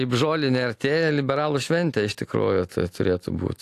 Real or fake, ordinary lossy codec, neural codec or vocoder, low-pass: real; AAC, 64 kbps; none; 14.4 kHz